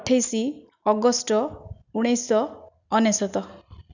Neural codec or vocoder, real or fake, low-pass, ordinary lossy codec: none; real; 7.2 kHz; none